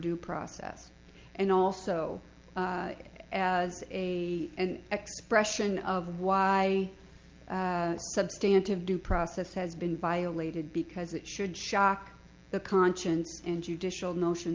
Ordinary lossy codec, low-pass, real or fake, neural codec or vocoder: Opus, 24 kbps; 7.2 kHz; real; none